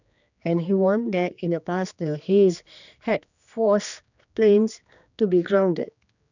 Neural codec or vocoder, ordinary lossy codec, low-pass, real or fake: codec, 16 kHz, 2 kbps, X-Codec, HuBERT features, trained on general audio; none; 7.2 kHz; fake